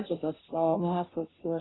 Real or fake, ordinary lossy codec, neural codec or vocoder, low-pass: fake; AAC, 16 kbps; codec, 16 kHz, 0.5 kbps, FunCodec, trained on Chinese and English, 25 frames a second; 7.2 kHz